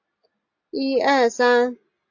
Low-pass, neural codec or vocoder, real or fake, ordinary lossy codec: 7.2 kHz; none; real; AAC, 48 kbps